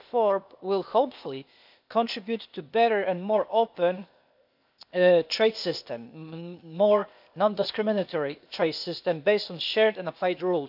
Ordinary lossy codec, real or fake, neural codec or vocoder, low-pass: none; fake; codec, 16 kHz, 0.8 kbps, ZipCodec; 5.4 kHz